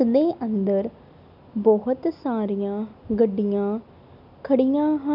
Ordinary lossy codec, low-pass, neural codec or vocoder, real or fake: none; 5.4 kHz; none; real